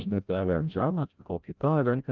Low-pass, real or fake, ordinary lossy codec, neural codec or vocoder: 7.2 kHz; fake; Opus, 24 kbps; codec, 16 kHz, 0.5 kbps, FreqCodec, larger model